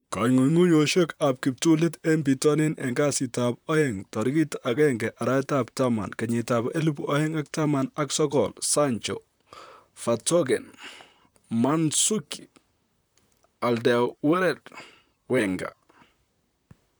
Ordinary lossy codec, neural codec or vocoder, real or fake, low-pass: none; vocoder, 44.1 kHz, 128 mel bands, Pupu-Vocoder; fake; none